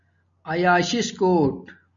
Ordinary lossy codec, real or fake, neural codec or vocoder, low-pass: MP3, 96 kbps; real; none; 7.2 kHz